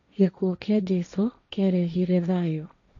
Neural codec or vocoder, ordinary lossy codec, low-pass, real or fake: codec, 16 kHz, 1.1 kbps, Voila-Tokenizer; none; 7.2 kHz; fake